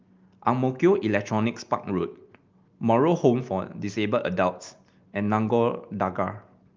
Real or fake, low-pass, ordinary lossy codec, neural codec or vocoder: real; 7.2 kHz; Opus, 24 kbps; none